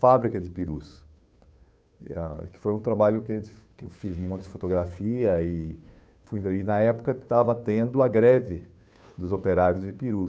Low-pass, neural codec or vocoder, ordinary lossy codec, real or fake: none; codec, 16 kHz, 2 kbps, FunCodec, trained on Chinese and English, 25 frames a second; none; fake